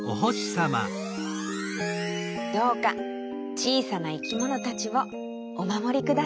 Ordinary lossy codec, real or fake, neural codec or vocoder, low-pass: none; real; none; none